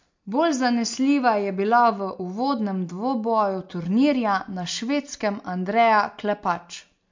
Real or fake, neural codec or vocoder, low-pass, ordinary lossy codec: real; none; 7.2 kHz; MP3, 48 kbps